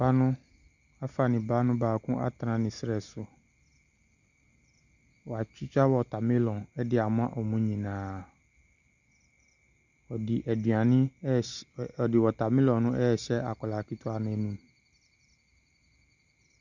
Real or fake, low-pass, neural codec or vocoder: real; 7.2 kHz; none